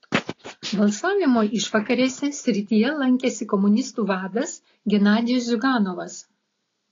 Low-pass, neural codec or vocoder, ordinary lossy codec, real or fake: 7.2 kHz; none; AAC, 32 kbps; real